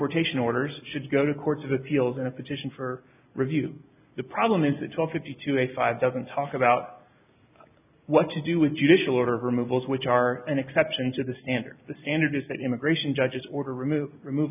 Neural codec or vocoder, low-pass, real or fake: none; 3.6 kHz; real